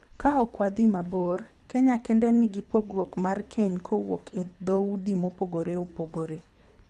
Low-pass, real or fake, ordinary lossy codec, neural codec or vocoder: none; fake; none; codec, 24 kHz, 3 kbps, HILCodec